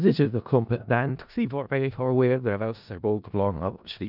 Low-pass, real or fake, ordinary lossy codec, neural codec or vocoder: 5.4 kHz; fake; none; codec, 16 kHz in and 24 kHz out, 0.4 kbps, LongCat-Audio-Codec, four codebook decoder